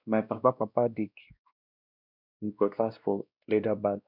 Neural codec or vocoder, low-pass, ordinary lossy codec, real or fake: codec, 16 kHz, 1 kbps, X-Codec, WavLM features, trained on Multilingual LibriSpeech; 5.4 kHz; none; fake